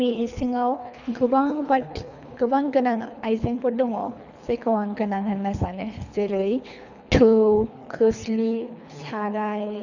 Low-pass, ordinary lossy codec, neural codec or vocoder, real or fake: 7.2 kHz; none; codec, 24 kHz, 3 kbps, HILCodec; fake